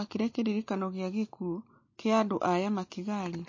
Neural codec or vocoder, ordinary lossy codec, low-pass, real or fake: none; MP3, 32 kbps; 7.2 kHz; real